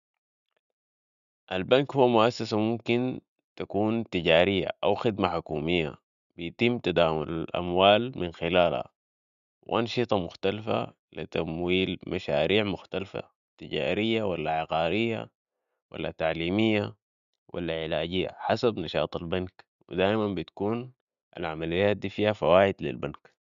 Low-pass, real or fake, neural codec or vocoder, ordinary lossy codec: 7.2 kHz; real; none; none